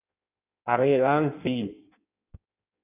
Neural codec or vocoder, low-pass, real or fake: codec, 16 kHz in and 24 kHz out, 1.1 kbps, FireRedTTS-2 codec; 3.6 kHz; fake